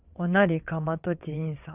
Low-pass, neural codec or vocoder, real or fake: 3.6 kHz; vocoder, 22.05 kHz, 80 mel bands, WaveNeXt; fake